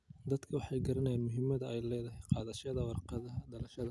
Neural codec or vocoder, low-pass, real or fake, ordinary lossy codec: none; none; real; none